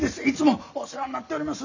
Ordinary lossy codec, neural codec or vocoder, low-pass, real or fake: none; none; 7.2 kHz; real